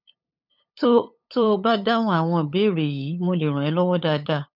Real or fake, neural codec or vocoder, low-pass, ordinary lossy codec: fake; codec, 16 kHz, 8 kbps, FunCodec, trained on LibriTTS, 25 frames a second; 5.4 kHz; none